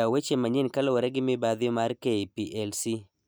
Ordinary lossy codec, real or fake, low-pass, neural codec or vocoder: none; real; none; none